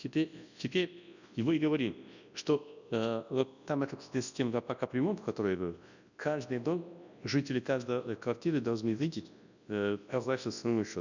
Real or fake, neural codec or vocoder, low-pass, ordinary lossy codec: fake; codec, 24 kHz, 0.9 kbps, WavTokenizer, large speech release; 7.2 kHz; none